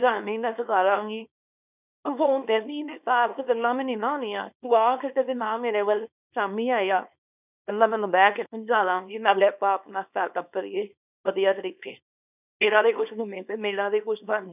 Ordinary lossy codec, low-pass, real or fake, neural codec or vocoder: none; 3.6 kHz; fake; codec, 24 kHz, 0.9 kbps, WavTokenizer, small release